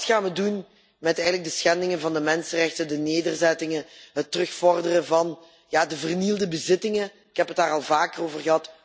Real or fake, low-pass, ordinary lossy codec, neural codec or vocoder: real; none; none; none